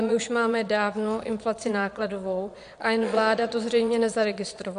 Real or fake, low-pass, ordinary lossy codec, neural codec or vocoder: fake; 9.9 kHz; MP3, 64 kbps; vocoder, 22.05 kHz, 80 mel bands, Vocos